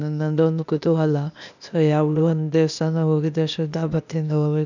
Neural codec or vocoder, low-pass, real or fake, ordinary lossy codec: codec, 16 kHz, 0.8 kbps, ZipCodec; 7.2 kHz; fake; none